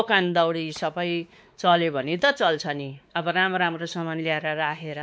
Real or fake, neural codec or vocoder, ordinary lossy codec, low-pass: fake; codec, 16 kHz, 4 kbps, X-Codec, WavLM features, trained on Multilingual LibriSpeech; none; none